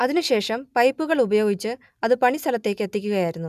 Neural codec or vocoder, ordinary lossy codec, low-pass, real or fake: none; none; 14.4 kHz; real